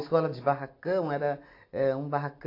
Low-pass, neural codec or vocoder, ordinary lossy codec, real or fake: 5.4 kHz; none; AAC, 32 kbps; real